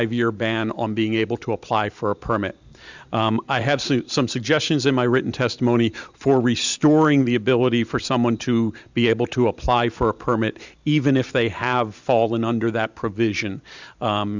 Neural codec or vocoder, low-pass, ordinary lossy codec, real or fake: vocoder, 44.1 kHz, 128 mel bands every 512 samples, BigVGAN v2; 7.2 kHz; Opus, 64 kbps; fake